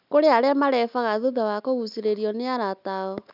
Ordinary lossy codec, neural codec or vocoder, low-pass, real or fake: none; none; 5.4 kHz; real